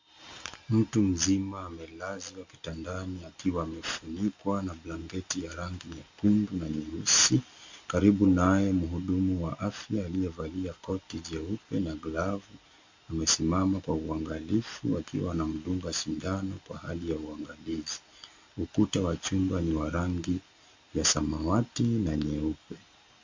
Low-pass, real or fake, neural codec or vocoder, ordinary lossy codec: 7.2 kHz; real; none; MP3, 64 kbps